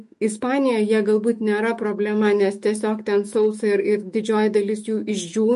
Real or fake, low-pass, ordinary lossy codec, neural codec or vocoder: fake; 14.4 kHz; MP3, 48 kbps; autoencoder, 48 kHz, 128 numbers a frame, DAC-VAE, trained on Japanese speech